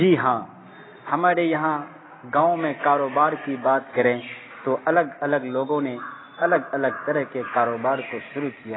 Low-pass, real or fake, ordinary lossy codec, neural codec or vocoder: 7.2 kHz; real; AAC, 16 kbps; none